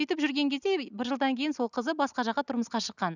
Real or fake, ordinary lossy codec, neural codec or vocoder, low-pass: real; none; none; 7.2 kHz